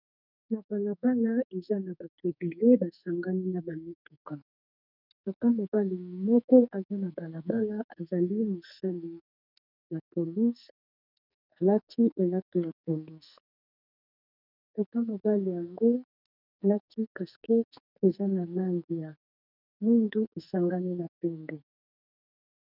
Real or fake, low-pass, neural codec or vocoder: fake; 5.4 kHz; codec, 44.1 kHz, 2.6 kbps, SNAC